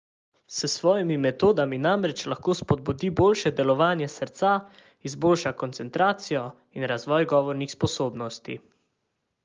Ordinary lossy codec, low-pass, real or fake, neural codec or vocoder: Opus, 32 kbps; 7.2 kHz; real; none